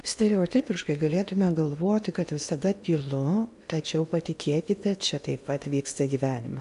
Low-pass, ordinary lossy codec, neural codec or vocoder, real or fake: 10.8 kHz; AAC, 96 kbps; codec, 16 kHz in and 24 kHz out, 0.8 kbps, FocalCodec, streaming, 65536 codes; fake